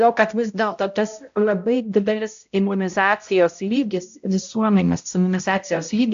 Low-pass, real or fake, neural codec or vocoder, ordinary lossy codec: 7.2 kHz; fake; codec, 16 kHz, 0.5 kbps, X-Codec, HuBERT features, trained on balanced general audio; AAC, 64 kbps